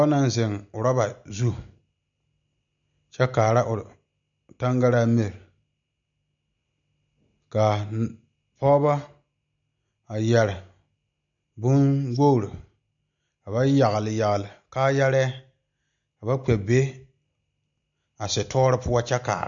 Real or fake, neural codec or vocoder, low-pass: real; none; 7.2 kHz